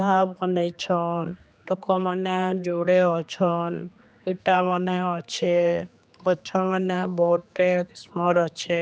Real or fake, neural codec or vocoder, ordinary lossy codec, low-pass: fake; codec, 16 kHz, 2 kbps, X-Codec, HuBERT features, trained on general audio; none; none